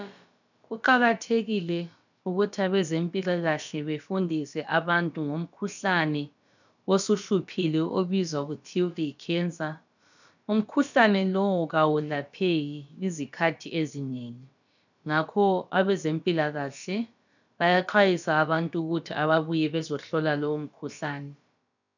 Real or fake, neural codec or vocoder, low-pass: fake; codec, 16 kHz, about 1 kbps, DyCAST, with the encoder's durations; 7.2 kHz